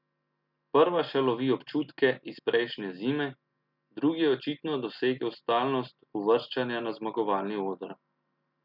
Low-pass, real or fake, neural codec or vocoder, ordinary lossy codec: 5.4 kHz; real; none; none